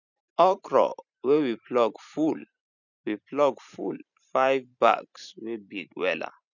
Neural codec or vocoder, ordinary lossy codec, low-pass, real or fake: vocoder, 44.1 kHz, 128 mel bands every 512 samples, BigVGAN v2; none; 7.2 kHz; fake